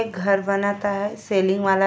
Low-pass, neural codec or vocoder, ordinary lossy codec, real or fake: none; none; none; real